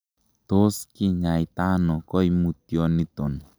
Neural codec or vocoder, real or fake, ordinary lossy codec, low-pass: none; real; none; none